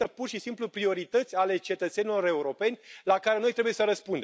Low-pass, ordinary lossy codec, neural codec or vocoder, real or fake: none; none; none; real